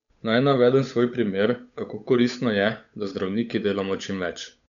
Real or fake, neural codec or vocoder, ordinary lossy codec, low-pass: fake; codec, 16 kHz, 8 kbps, FunCodec, trained on Chinese and English, 25 frames a second; none; 7.2 kHz